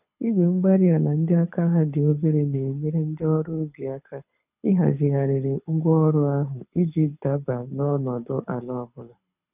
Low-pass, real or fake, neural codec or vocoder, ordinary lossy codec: 3.6 kHz; fake; codec, 24 kHz, 6 kbps, HILCodec; none